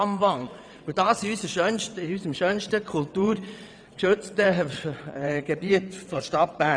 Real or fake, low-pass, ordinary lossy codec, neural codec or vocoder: fake; 9.9 kHz; none; vocoder, 22.05 kHz, 80 mel bands, WaveNeXt